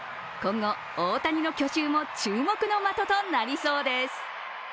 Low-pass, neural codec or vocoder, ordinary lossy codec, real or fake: none; none; none; real